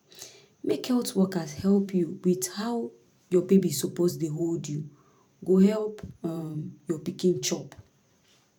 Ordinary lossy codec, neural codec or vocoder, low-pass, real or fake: none; vocoder, 44.1 kHz, 128 mel bands every 512 samples, BigVGAN v2; 19.8 kHz; fake